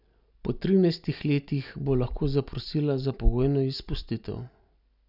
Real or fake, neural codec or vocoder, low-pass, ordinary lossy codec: real; none; 5.4 kHz; none